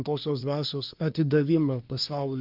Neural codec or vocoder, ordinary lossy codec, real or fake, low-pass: codec, 24 kHz, 1 kbps, SNAC; Opus, 24 kbps; fake; 5.4 kHz